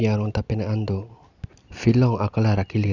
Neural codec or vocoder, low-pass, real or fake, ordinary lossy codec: none; 7.2 kHz; real; none